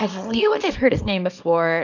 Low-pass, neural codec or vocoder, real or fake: 7.2 kHz; codec, 24 kHz, 0.9 kbps, WavTokenizer, small release; fake